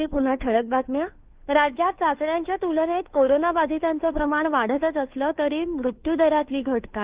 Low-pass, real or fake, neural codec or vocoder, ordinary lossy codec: 3.6 kHz; fake; codec, 16 kHz, 2 kbps, FunCodec, trained on Chinese and English, 25 frames a second; Opus, 24 kbps